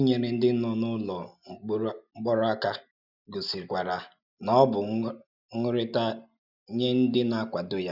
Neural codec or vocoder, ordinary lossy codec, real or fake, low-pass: none; none; real; 5.4 kHz